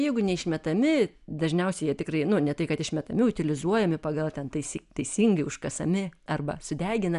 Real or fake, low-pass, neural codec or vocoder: real; 10.8 kHz; none